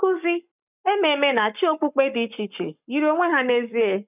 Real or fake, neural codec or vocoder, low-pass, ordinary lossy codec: fake; codec, 44.1 kHz, 7.8 kbps, Pupu-Codec; 3.6 kHz; none